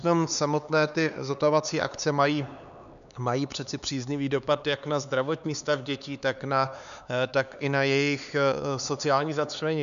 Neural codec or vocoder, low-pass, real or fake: codec, 16 kHz, 4 kbps, X-Codec, HuBERT features, trained on LibriSpeech; 7.2 kHz; fake